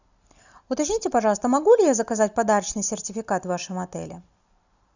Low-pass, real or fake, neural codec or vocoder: 7.2 kHz; real; none